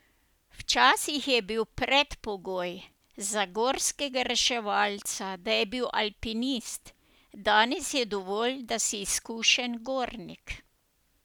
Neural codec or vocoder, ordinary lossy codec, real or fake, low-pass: none; none; real; none